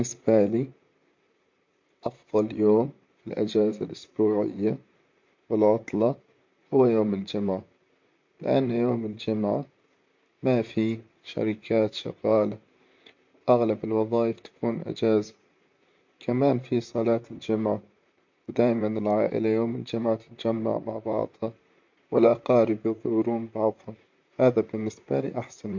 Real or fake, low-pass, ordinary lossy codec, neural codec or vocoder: fake; 7.2 kHz; MP3, 48 kbps; vocoder, 44.1 kHz, 128 mel bands, Pupu-Vocoder